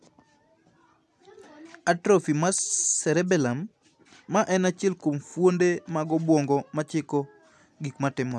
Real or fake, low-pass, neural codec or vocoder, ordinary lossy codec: real; none; none; none